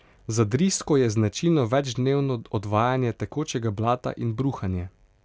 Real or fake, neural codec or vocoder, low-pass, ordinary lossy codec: real; none; none; none